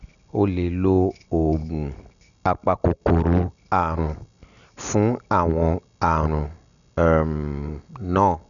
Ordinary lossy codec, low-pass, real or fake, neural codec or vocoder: none; 7.2 kHz; real; none